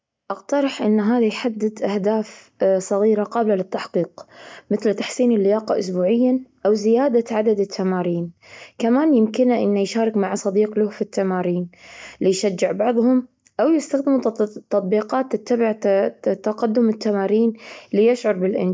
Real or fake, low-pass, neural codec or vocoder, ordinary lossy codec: real; none; none; none